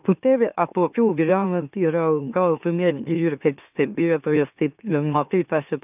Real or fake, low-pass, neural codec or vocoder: fake; 3.6 kHz; autoencoder, 44.1 kHz, a latent of 192 numbers a frame, MeloTTS